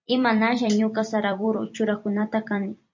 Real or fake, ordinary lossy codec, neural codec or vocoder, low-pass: fake; MP3, 48 kbps; vocoder, 44.1 kHz, 128 mel bands every 256 samples, BigVGAN v2; 7.2 kHz